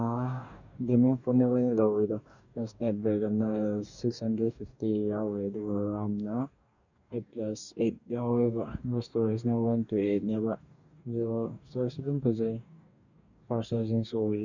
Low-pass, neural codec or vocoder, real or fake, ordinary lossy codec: 7.2 kHz; codec, 44.1 kHz, 2.6 kbps, DAC; fake; none